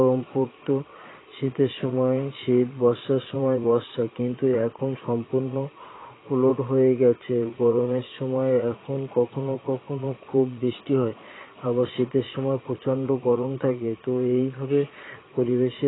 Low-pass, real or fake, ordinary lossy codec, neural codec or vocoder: 7.2 kHz; fake; AAC, 16 kbps; vocoder, 22.05 kHz, 80 mel bands, Vocos